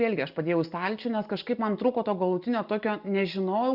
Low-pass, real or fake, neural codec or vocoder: 5.4 kHz; real; none